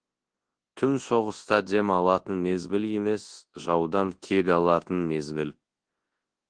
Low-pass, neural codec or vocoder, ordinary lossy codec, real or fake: 9.9 kHz; codec, 24 kHz, 0.9 kbps, WavTokenizer, large speech release; Opus, 16 kbps; fake